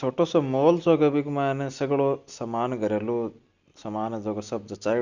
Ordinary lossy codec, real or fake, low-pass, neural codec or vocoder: Opus, 64 kbps; real; 7.2 kHz; none